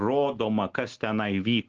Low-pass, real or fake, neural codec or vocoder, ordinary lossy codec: 7.2 kHz; real; none; Opus, 16 kbps